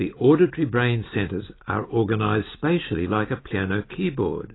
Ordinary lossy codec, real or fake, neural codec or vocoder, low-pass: AAC, 16 kbps; real; none; 7.2 kHz